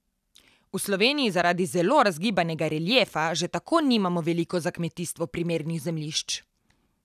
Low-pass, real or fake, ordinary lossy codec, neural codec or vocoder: 14.4 kHz; real; none; none